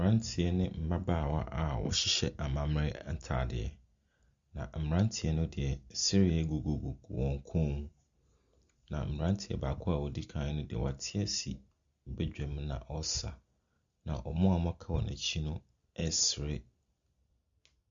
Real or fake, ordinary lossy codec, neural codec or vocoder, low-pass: real; Opus, 64 kbps; none; 7.2 kHz